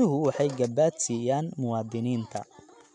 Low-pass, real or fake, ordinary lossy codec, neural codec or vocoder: 10.8 kHz; real; none; none